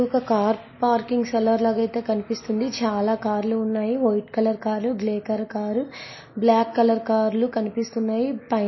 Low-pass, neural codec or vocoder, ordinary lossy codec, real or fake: 7.2 kHz; none; MP3, 24 kbps; real